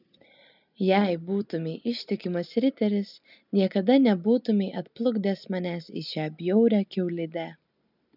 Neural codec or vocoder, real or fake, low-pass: none; real; 5.4 kHz